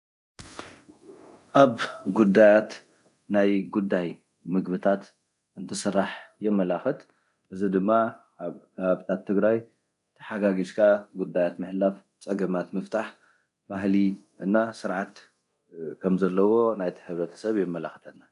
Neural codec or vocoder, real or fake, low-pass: codec, 24 kHz, 0.9 kbps, DualCodec; fake; 10.8 kHz